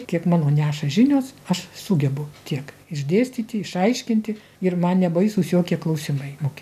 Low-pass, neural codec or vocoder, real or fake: 14.4 kHz; none; real